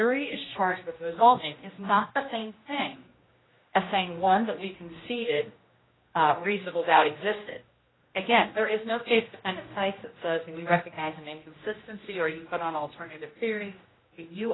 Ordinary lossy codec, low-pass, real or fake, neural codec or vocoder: AAC, 16 kbps; 7.2 kHz; fake; codec, 16 kHz, 1 kbps, X-Codec, HuBERT features, trained on general audio